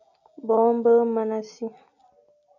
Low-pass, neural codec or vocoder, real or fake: 7.2 kHz; none; real